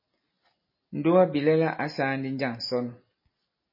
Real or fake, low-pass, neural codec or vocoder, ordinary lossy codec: real; 5.4 kHz; none; MP3, 24 kbps